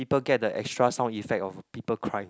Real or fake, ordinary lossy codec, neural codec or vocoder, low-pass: real; none; none; none